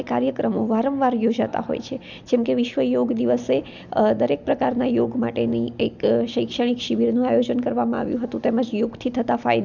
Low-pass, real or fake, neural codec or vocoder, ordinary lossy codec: 7.2 kHz; real; none; none